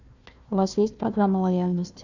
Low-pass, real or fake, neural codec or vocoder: 7.2 kHz; fake; codec, 16 kHz, 1 kbps, FunCodec, trained on Chinese and English, 50 frames a second